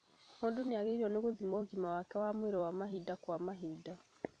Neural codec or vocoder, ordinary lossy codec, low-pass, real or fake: vocoder, 44.1 kHz, 128 mel bands every 256 samples, BigVGAN v2; AAC, 48 kbps; 9.9 kHz; fake